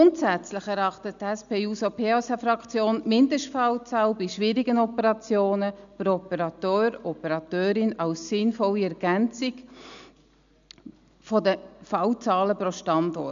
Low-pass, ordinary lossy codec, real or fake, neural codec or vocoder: 7.2 kHz; none; real; none